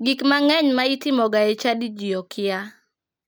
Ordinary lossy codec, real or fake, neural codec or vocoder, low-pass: none; real; none; none